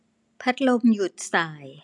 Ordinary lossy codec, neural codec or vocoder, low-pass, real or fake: none; none; 10.8 kHz; real